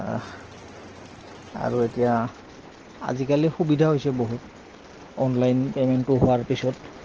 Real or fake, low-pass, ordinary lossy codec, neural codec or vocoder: real; 7.2 kHz; Opus, 16 kbps; none